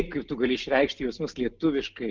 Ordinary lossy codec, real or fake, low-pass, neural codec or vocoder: Opus, 32 kbps; real; 7.2 kHz; none